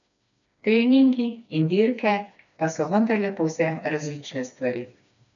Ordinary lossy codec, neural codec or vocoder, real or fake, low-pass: none; codec, 16 kHz, 2 kbps, FreqCodec, smaller model; fake; 7.2 kHz